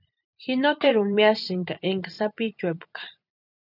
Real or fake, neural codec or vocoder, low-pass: fake; vocoder, 44.1 kHz, 128 mel bands every 256 samples, BigVGAN v2; 5.4 kHz